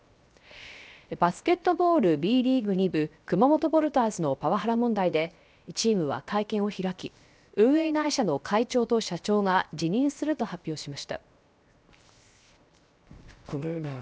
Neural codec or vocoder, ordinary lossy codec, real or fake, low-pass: codec, 16 kHz, 0.7 kbps, FocalCodec; none; fake; none